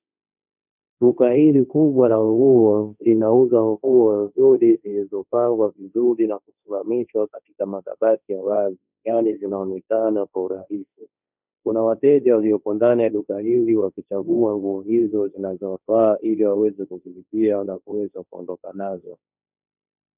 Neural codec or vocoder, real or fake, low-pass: codec, 16 kHz, 1.1 kbps, Voila-Tokenizer; fake; 3.6 kHz